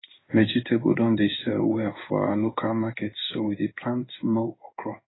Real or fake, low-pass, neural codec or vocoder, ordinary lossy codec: fake; 7.2 kHz; codec, 16 kHz in and 24 kHz out, 1 kbps, XY-Tokenizer; AAC, 16 kbps